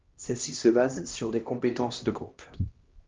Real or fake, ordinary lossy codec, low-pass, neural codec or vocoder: fake; Opus, 32 kbps; 7.2 kHz; codec, 16 kHz, 1 kbps, X-Codec, HuBERT features, trained on LibriSpeech